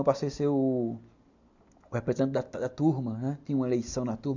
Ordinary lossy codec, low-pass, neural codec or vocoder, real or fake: none; 7.2 kHz; none; real